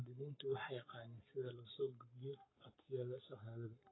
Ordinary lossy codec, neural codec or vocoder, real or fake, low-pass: AAC, 16 kbps; codec, 44.1 kHz, 7.8 kbps, Pupu-Codec; fake; 7.2 kHz